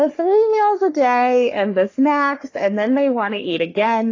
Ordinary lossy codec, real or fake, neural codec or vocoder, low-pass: AAC, 32 kbps; fake; codec, 44.1 kHz, 3.4 kbps, Pupu-Codec; 7.2 kHz